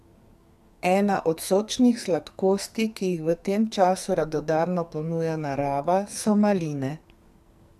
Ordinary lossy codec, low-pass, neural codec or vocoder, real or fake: MP3, 96 kbps; 14.4 kHz; codec, 44.1 kHz, 2.6 kbps, SNAC; fake